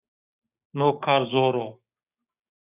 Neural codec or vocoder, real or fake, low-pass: codec, 16 kHz, 6 kbps, DAC; fake; 3.6 kHz